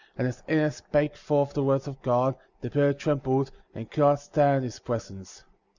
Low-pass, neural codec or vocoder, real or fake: 7.2 kHz; none; real